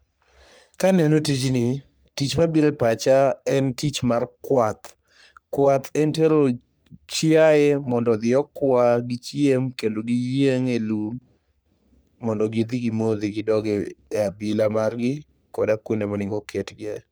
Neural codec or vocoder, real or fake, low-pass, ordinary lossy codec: codec, 44.1 kHz, 3.4 kbps, Pupu-Codec; fake; none; none